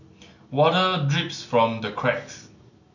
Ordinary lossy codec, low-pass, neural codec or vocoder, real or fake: none; 7.2 kHz; none; real